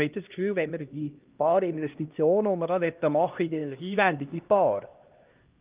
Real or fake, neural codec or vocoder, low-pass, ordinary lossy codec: fake; codec, 16 kHz, 1 kbps, X-Codec, HuBERT features, trained on LibriSpeech; 3.6 kHz; Opus, 32 kbps